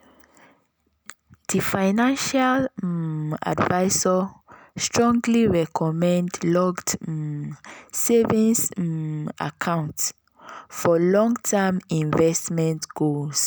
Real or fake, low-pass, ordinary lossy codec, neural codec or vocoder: real; none; none; none